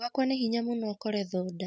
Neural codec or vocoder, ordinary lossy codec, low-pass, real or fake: none; none; none; real